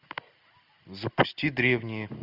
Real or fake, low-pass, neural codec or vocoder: real; 5.4 kHz; none